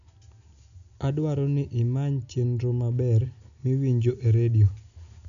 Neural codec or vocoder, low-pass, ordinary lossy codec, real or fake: none; 7.2 kHz; none; real